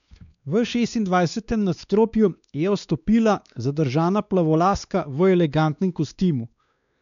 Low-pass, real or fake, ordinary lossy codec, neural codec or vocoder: 7.2 kHz; fake; none; codec, 16 kHz, 2 kbps, X-Codec, WavLM features, trained on Multilingual LibriSpeech